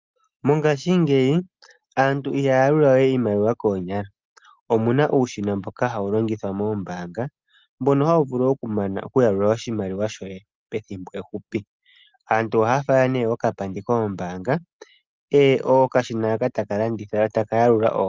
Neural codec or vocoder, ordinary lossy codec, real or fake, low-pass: none; Opus, 32 kbps; real; 7.2 kHz